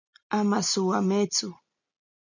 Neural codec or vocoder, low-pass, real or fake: none; 7.2 kHz; real